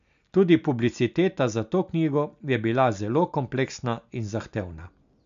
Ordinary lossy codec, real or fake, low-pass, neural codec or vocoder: MP3, 64 kbps; real; 7.2 kHz; none